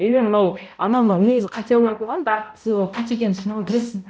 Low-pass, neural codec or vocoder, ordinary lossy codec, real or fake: none; codec, 16 kHz, 0.5 kbps, X-Codec, HuBERT features, trained on balanced general audio; none; fake